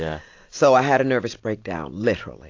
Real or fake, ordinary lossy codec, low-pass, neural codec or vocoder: real; AAC, 48 kbps; 7.2 kHz; none